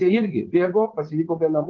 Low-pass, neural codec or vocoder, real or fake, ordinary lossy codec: 7.2 kHz; vocoder, 22.05 kHz, 80 mel bands, Vocos; fake; Opus, 16 kbps